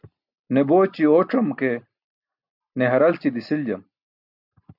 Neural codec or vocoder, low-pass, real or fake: none; 5.4 kHz; real